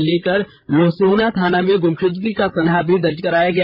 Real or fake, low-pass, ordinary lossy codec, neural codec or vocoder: fake; 5.4 kHz; none; codec, 16 kHz, 8 kbps, FreqCodec, larger model